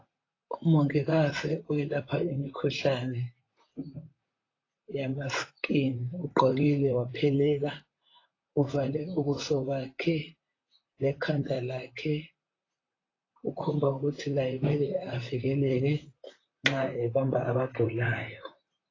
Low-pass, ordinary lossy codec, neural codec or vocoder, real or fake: 7.2 kHz; AAC, 32 kbps; vocoder, 44.1 kHz, 128 mel bands, Pupu-Vocoder; fake